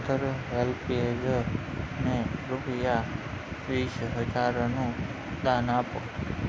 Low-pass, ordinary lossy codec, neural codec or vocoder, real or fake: none; none; none; real